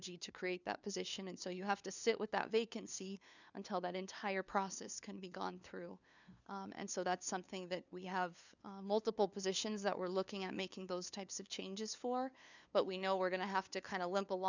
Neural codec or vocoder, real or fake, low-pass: codec, 16 kHz, 2 kbps, FunCodec, trained on LibriTTS, 25 frames a second; fake; 7.2 kHz